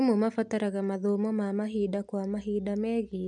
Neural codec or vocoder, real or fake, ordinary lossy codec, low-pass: none; real; none; 10.8 kHz